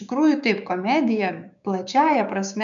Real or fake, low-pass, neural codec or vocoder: fake; 7.2 kHz; codec, 16 kHz, 6 kbps, DAC